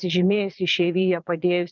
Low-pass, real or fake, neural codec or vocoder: 7.2 kHz; fake; vocoder, 22.05 kHz, 80 mel bands, Vocos